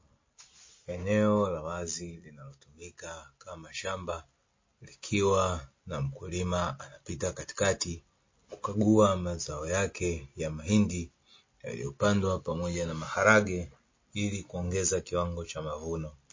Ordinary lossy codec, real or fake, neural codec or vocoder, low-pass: MP3, 32 kbps; real; none; 7.2 kHz